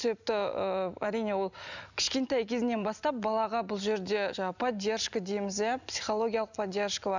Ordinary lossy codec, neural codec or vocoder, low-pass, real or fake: none; none; 7.2 kHz; real